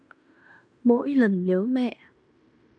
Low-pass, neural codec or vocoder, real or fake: 9.9 kHz; codec, 16 kHz in and 24 kHz out, 0.9 kbps, LongCat-Audio-Codec, fine tuned four codebook decoder; fake